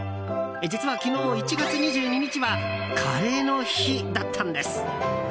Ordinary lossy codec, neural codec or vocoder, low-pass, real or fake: none; none; none; real